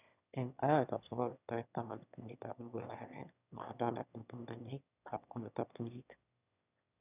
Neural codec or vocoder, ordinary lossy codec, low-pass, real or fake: autoencoder, 22.05 kHz, a latent of 192 numbers a frame, VITS, trained on one speaker; none; 3.6 kHz; fake